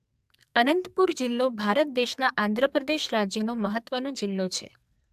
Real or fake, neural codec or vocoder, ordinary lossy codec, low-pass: fake; codec, 44.1 kHz, 2.6 kbps, SNAC; AAC, 96 kbps; 14.4 kHz